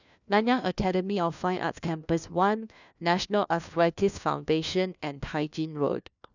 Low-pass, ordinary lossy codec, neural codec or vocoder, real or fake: 7.2 kHz; none; codec, 16 kHz, 1 kbps, FunCodec, trained on LibriTTS, 50 frames a second; fake